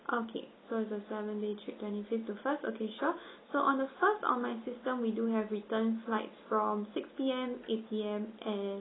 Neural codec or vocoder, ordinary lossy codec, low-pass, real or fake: none; AAC, 16 kbps; 7.2 kHz; real